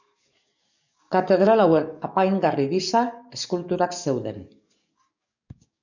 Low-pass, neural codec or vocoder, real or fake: 7.2 kHz; codec, 44.1 kHz, 7.8 kbps, DAC; fake